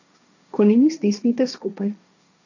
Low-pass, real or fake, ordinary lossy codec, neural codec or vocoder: 7.2 kHz; fake; none; codec, 16 kHz, 1.1 kbps, Voila-Tokenizer